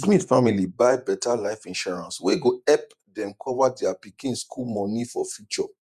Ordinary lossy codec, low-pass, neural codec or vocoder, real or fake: none; 14.4 kHz; vocoder, 48 kHz, 128 mel bands, Vocos; fake